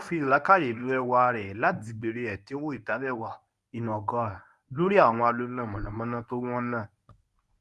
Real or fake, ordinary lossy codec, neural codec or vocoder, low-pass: fake; none; codec, 24 kHz, 0.9 kbps, WavTokenizer, medium speech release version 1; none